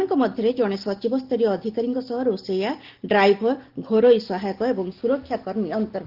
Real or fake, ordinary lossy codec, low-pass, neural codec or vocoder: real; Opus, 16 kbps; 5.4 kHz; none